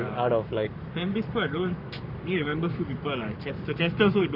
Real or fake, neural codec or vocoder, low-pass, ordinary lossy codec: fake; codec, 44.1 kHz, 7.8 kbps, Pupu-Codec; 5.4 kHz; none